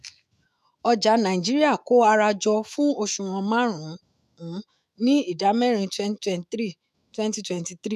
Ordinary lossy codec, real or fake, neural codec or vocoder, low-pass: none; fake; autoencoder, 48 kHz, 128 numbers a frame, DAC-VAE, trained on Japanese speech; 14.4 kHz